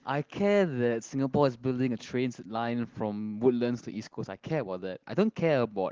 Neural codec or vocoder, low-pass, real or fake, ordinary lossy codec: none; 7.2 kHz; real; Opus, 16 kbps